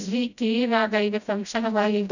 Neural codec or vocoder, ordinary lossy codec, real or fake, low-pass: codec, 16 kHz, 0.5 kbps, FreqCodec, smaller model; none; fake; 7.2 kHz